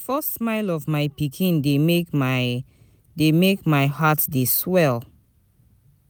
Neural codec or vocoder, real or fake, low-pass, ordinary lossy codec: none; real; none; none